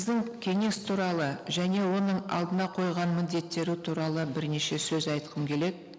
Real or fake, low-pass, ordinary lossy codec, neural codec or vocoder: real; none; none; none